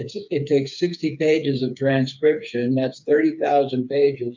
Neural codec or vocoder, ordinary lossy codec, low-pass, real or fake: codec, 16 kHz, 4 kbps, FreqCodec, smaller model; MP3, 64 kbps; 7.2 kHz; fake